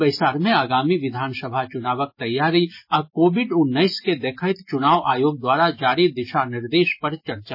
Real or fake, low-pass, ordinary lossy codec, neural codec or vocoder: real; 5.4 kHz; MP3, 24 kbps; none